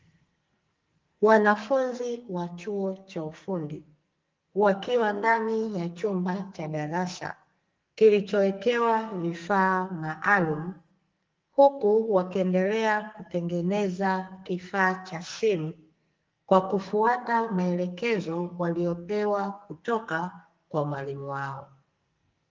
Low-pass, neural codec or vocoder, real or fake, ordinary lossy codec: 7.2 kHz; codec, 44.1 kHz, 2.6 kbps, SNAC; fake; Opus, 32 kbps